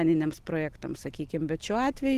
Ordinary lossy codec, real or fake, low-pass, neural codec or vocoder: Opus, 16 kbps; real; 14.4 kHz; none